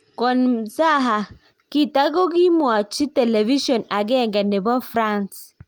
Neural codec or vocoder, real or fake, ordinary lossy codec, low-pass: none; real; Opus, 32 kbps; 19.8 kHz